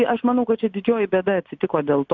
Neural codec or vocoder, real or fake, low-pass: none; real; 7.2 kHz